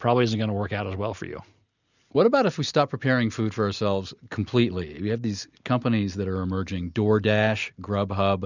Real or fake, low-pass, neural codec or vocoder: real; 7.2 kHz; none